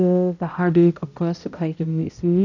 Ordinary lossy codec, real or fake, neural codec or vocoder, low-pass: none; fake; codec, 16 kHz, 0.5 kbps, X-Codec, HuBERT features, trained on balanced general audio; 7.2 kHz